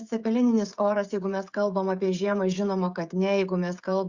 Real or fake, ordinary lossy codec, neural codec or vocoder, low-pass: fake; Opus, 64 kbps; codec, 16 kHz, 16 kbps, FreqCodec, smaller model; 7.2 kHz